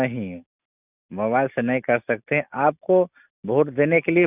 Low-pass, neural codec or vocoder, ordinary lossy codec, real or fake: 3.6 kHz; none; none; real